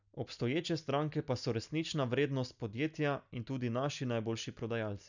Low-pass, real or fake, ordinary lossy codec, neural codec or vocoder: 7.2 kHz; real; none; none